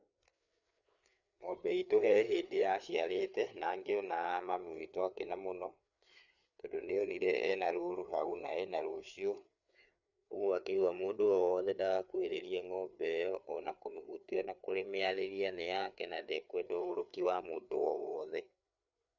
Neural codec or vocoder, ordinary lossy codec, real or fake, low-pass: codec, 16 kHz, 4 kbps, FreqCodec, larger model; none; fake; 7.2 kHz